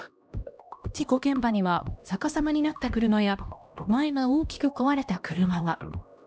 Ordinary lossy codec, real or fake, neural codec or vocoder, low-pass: none; fake; codec, 16 kHz, 1 kbps, X-Codec, HuBERT features, trained on LibriSpeech; none